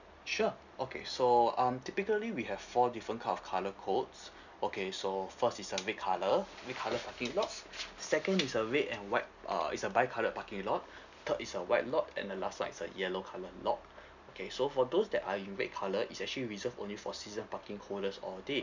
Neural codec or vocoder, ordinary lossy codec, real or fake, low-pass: none; none; real; 7.2 kHz